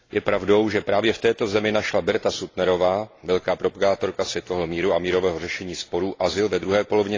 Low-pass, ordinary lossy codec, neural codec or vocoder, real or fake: 7.2 kHz; AAC, 32 kbps; none; real